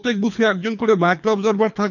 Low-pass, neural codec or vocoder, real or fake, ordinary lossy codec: 7.2 kHz; codec, 24 kHz, 3 kbps, HILCodec; fake; none